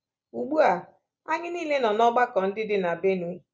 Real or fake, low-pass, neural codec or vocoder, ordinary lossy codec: real; none; none; none